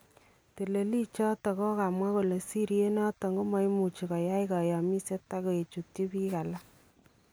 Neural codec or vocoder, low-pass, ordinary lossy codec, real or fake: none; none; none; real